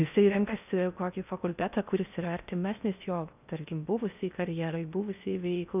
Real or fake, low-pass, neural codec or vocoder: fake; 3.6 kHz; codec, 16 kHz in and 24 kHz out, 0.6 kbps, FocalCodec, streaming, 4096 codes